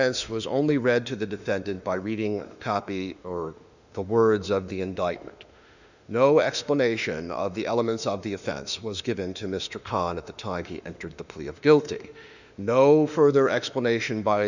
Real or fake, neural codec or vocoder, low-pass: fake; autoencoder, 48 kHz, 32 numbers a frame, DAC-VAE, trained on Japanese speech; 7.2 kHz